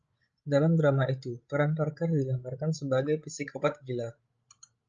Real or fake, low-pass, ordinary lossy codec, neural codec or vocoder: fake; 7.2 kHz; Opus, 24 kbps; codec, 16 kHz, 16 kbps, FreqCodec, larger model